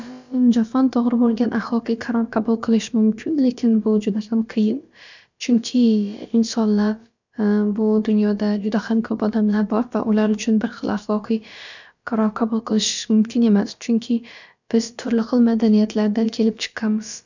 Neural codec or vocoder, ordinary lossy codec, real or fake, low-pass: codec, 16 kHz, about 1 kbps, DyCAST, with the encoder's durations; none; fake; 7.2 kHz